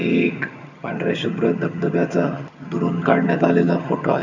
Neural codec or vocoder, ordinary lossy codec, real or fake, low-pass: vocoder, 22.05 kHz, 80 mel bands, HiFi-GAN; none; fake; 7.2 kHz